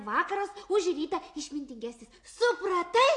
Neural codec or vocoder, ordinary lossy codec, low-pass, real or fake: none; AAC, 48 kbps; 10.8 kHz; real